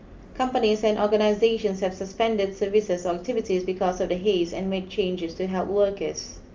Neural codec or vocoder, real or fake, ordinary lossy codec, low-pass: none; real; Opus, 32 kbps; 7.2 kHz